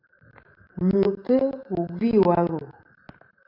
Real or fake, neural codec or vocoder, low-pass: fake; vocoder, 44.1 kHz, 128 mel bands every 512 samples, BigVGAN v2; 5.4 kHz